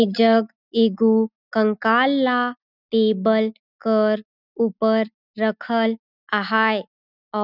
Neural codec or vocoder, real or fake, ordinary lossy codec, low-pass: none; real; none; 5.4 kHz